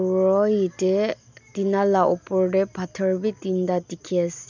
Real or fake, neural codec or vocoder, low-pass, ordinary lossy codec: real; none; 7.2 kHz; none